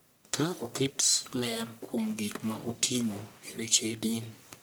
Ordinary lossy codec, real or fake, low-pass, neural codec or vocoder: none; fake; none; codec, 44.1 kHz, 1.7 kbps, Pupu-Codec